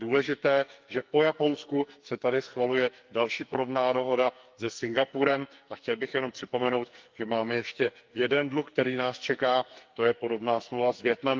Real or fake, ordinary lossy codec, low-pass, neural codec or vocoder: fake; Opus, 32 kbps; 7.2 kHz; codec, 44.1 kHz, 2.6 kbps, SNAC